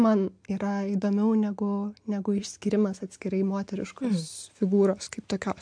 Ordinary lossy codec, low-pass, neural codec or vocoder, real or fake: MP3, 64 kbps; 9.9 kHz; none; real